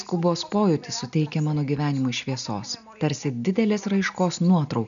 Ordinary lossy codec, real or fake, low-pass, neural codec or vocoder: MP3, 96 kbps; real; 7.2 kHz; none